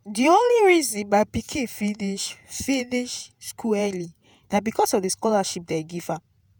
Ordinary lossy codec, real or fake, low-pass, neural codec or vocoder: none; fake; none; vocoder, 48 kHz, 128 mel bands, Vocos